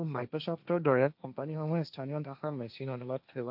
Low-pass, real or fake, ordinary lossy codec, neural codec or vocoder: 5.4 kHz; fake; AAC, 48 kbps; codec, 16 kHz, 1.1 kbps, Voila-Tokenizer